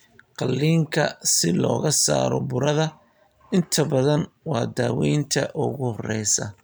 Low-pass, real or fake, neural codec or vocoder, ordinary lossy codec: none; real; none; none